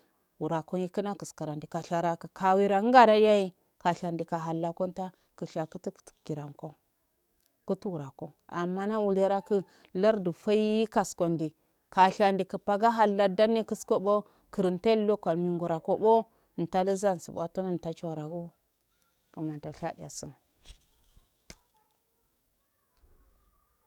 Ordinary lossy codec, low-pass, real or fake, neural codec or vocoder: none; 19.8 kHz; fake; codec, 44.1 kHz, 7.8 kbps, DAC